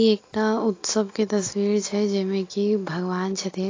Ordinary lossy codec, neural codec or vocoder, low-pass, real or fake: AAC, 32 kbps; none; 7.2 kHz; real